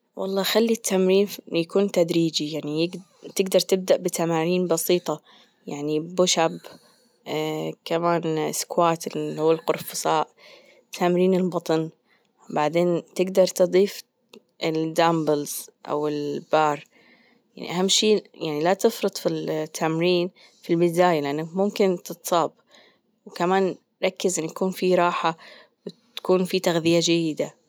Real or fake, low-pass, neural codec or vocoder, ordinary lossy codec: real; none; none; none